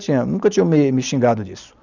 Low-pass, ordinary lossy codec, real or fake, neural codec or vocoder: 7.2 kHz; none; real; none